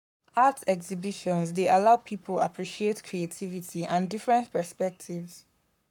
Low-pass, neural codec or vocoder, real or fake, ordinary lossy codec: 19.8 kHz; codec, 44.1 kHz, 7.8 kbps, Pupu-Codec; fake; none